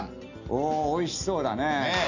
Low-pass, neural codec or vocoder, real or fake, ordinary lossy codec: 7.2 kHz; none; real; none